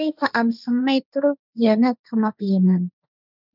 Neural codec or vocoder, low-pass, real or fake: codec, 16 kHz, 1.1 kbps, Voila-Tokenizer; 5.4 kHz; fake